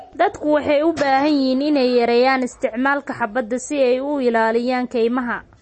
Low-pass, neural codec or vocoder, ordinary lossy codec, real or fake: 10.8 kHz; none; MP3, 32 kbps; real